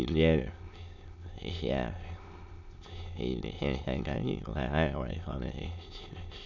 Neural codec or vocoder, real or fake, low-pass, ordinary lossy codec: autoencoder, 22.05 kHz, a latent of 192 numbers a frame, VITS, trained on many speakers; fake; 7.2 kHz; none